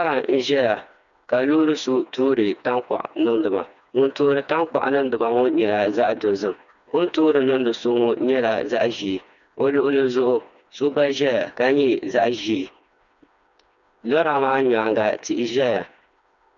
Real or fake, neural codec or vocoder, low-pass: fake; codec, 16 kHz, 2 kbps, FreqCodec, smaller model; 7.2 kHz